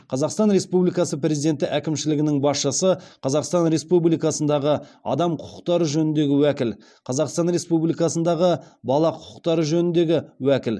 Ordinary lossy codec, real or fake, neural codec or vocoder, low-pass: none; real; none; none